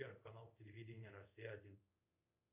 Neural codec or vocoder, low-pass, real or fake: codec, 24 kHz, 0.5 kbps, DualCodec; 3.6 kHz; fake